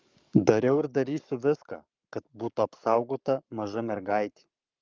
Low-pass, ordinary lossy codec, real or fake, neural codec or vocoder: 7.2 kHz; Opus, 32 kbps; fake; codec, 44.1 kHz, 7.8 kbps, Pupu-Codec